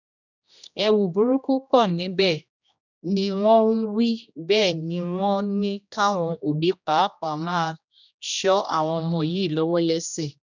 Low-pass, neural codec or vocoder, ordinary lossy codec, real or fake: 7.2 kHz; codec, 16 kHz, 1 kbps, X-Codec, HuBERT features, trained on general audio; none; fake